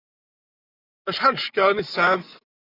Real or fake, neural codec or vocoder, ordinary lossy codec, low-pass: real; none; AAC, 24 kbps; 5.4 kHz